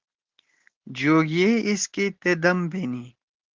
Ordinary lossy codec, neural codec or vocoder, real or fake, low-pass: Opus, 16 kbps; none; real; 7.2 kHz